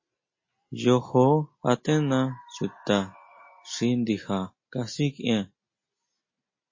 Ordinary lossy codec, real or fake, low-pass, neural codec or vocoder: MP3, 32 kbps; real; 7.2 kHz; none